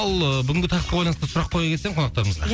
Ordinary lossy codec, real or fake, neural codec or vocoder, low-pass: none; real; none; none